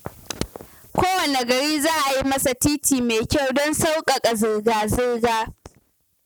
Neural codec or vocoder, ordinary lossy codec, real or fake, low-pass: none; none; real; none